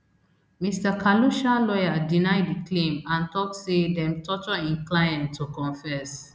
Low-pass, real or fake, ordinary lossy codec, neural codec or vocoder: none; real; none; none